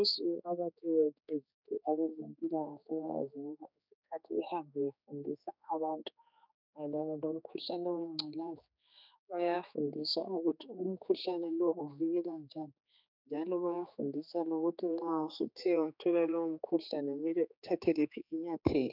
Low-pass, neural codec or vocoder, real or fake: 5.4 kHz; codec, 16 kHz, 4 kbps, X-Codec, HuBERT features, trained on general audio; fake